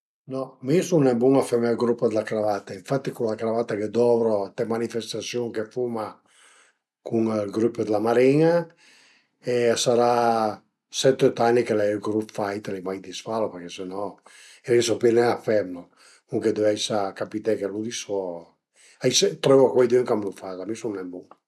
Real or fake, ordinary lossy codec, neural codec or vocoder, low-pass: real; none; none; none